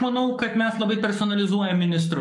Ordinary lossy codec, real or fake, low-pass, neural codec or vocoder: MP3, 96 kbps; fake; 10.8 kHz; codec, 44.1 kHz, 7.8 kbps, Pupu-Codec